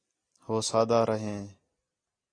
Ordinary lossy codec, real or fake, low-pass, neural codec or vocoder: AAC, 32 kbps; real; 9.9 kHz; none